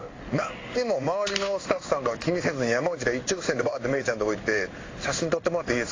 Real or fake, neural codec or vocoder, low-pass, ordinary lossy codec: fake; codec, 16 kHz in and 24 kHz out, 1 kbps, XY-Tokenizer; 7.2 kHz; AAC, 32 kbps